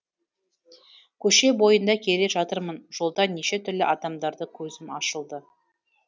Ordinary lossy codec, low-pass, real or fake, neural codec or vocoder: none; none; real; none